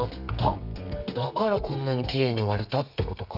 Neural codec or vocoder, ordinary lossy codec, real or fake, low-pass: codec, 32 kHz, 1.9 kbps, SNAC; none; fake; 5.4 kHz